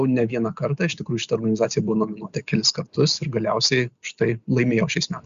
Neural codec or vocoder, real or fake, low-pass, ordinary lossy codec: none; real; 7.2 kHz; Opus, 64 kbps